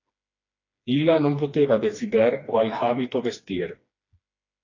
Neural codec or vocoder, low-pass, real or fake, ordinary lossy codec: codec, 16 kHz, 2 kbps, FreqCodec, smaller model; 7.2 kHz; fake; AAC, 32 kbps